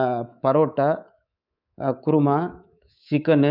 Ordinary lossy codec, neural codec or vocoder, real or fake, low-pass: none; codec, 24 kHz, 3.1 kbps, DualCodec; fake; 5.4 kHz